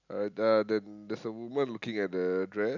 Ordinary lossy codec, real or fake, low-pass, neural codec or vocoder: none; real; 7.2 kHz; none